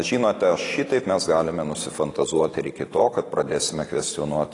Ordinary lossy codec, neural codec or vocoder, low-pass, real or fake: AAC, 32 kbps; none; 10.8 kHz; real